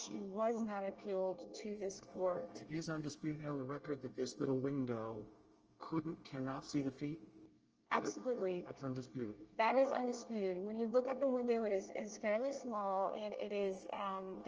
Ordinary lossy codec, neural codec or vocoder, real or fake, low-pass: Opus, 24 kbps; codec, 24 kHz, 1 kbps, SNAC; fake; 7.2 kHz